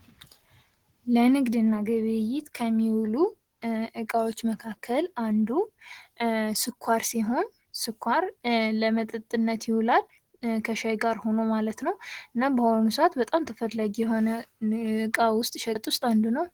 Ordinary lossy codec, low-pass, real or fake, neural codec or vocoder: Opus, 16 kbps; 19.8 kHz; real; none